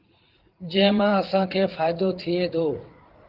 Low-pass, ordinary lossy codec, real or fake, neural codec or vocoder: 5.4 kHz; Opus, 16 kbps; fake; vocoder, 44.1 kHz, 80 mel bands, Vocos